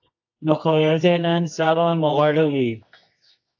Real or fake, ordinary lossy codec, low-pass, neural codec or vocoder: fake; AAC, 48 kbps; 7.2 kHz; codec, 24 kHz, 0.9 kbps, WavTokenizer, medium music audio release